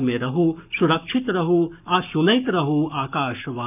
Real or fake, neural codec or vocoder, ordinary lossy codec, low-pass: fake; codec, 44.1 kHz, 7.8 kbps, Pupu-Codec; none; 3.6 kHz